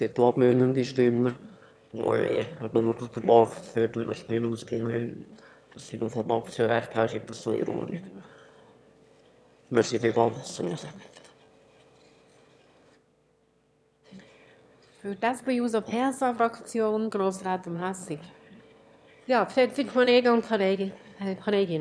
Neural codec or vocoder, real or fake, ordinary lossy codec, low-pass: autoencoder, 22.05 kHz, a latent of 192 numbers a frame, VITS, trained on one speaker; fake; none; none